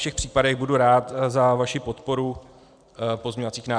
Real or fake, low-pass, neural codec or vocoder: real; 9.9 kHz; none